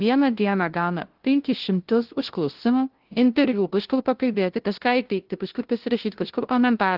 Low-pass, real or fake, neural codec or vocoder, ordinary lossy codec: 5.4 kHz; fake; codec, 16 kHz, 0.5 kbps, FunCodec, trained on LibriTTS, 25 frames a second; Opus, 16 kbps